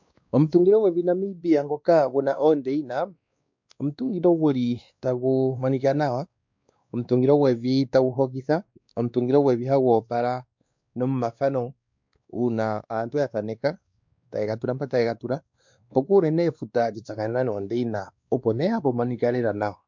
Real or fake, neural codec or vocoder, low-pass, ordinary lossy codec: fake; codec, 16 kHz, 2 kbps, X-Codec, WavLM features, trained on Multilingual LibriSpeech; 7.2 kHz; MP3, 64 kbps